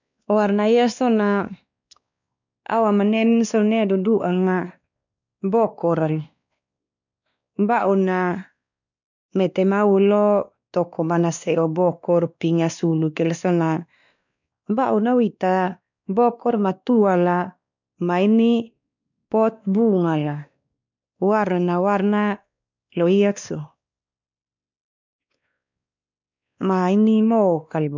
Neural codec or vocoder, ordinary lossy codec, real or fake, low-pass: codec, 16 kHz, 2 kbps, X-Codec, WavLM features, trained on Multilingual LibriSpeech; none; fake; 7.2 kHz